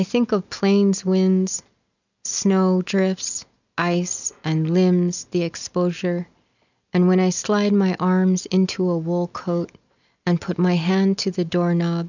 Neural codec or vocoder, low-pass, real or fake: none; 7.2 kHz; real